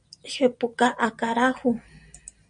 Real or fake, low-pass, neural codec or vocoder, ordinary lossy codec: real; 9.9 kHz; none; MP3, 96 kbps